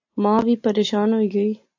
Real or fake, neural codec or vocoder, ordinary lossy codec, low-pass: real; none; AAC, 48 kbps; 7.2 kHz